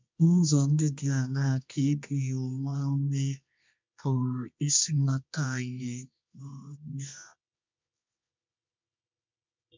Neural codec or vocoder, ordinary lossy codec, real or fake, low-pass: codec, 24 kHz, 0.9 kbps, WavTokenizer, medium music audio release; MP3, 64 kbps; fake; 7.2 kHz